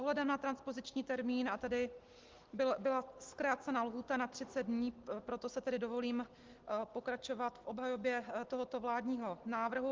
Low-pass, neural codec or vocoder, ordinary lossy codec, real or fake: 7.2 kHz; none; Opus, 16 kbps; real